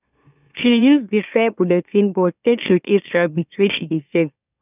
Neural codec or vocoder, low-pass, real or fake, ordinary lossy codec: autoencoder, 44.1 kHz, a latent of 192 numbers a frame, MeloTTS; 3.6 kHz; fake; none